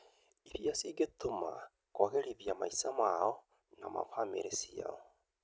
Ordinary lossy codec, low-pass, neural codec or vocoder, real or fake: none; none; none; real